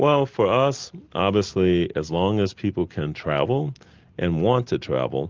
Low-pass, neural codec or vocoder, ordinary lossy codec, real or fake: 7.2 kHz; none; Opus, 24 kbps; real